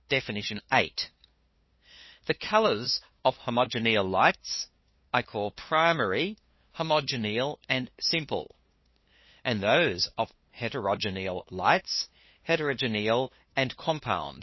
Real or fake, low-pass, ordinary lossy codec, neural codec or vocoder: fake; 7.2 kHz; MP3, 24 kbps; codec, 16 kHz, 2 kbps, FunCodec, trained on LibriTTS, 25 frames a second